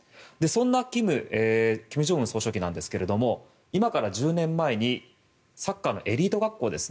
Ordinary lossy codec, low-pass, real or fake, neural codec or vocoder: none; none; real; none